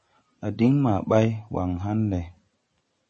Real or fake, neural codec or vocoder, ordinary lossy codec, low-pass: real; none; MP3, 32 kbps; 10.8 kHz